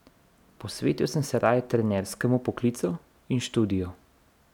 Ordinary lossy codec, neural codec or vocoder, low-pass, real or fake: none; none; 19.8 kHz; real